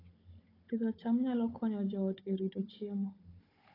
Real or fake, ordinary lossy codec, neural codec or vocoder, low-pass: fake; AAC, 24 kbps; codec, 16 kHz, 16 kbps, FunCodec, trained on Chinese and English, 50 frames a second; 5.4 kHz